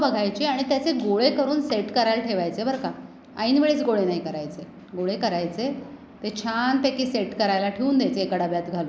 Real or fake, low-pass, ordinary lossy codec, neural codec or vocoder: real; none; none; none